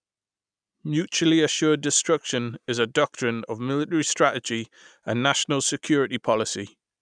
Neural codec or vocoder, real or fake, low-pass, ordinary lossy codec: none; real; 9.9 kHz; none